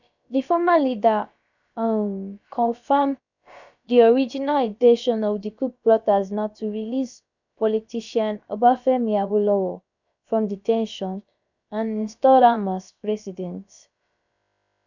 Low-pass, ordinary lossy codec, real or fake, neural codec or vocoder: 7.2 kHz; none; fake; codec, 16 kHz, about 1 kbps, DyCAST, with the encoder's durations